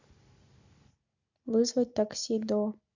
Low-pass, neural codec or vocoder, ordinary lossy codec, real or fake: 7.2 kHz; none; AAC, 48 kbps; real